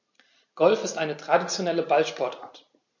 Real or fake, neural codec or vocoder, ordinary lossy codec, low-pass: real; none; MP3, 48 kbps; 7.2 kHz